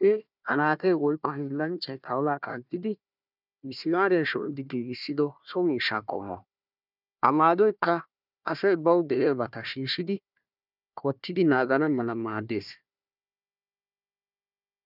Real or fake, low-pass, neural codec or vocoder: fake; 5.4 kHz; codec, 16 kHz, 1 kbps, FunCodec, trained on Chinese and English, 50 frames a second